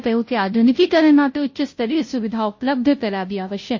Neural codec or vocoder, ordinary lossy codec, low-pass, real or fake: codec, 16 kHz, 0.5 kbps, FunCodec, trained on Chinese and English, 25 frames a second; MP3, 32 kbps; 7.2 kHz; fake